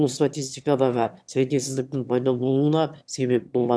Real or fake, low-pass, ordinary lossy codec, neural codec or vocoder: fake; none; none; autoencoder, 22.05 kHz, a latent of 192 numbers a frame, VITS, trained on one speaker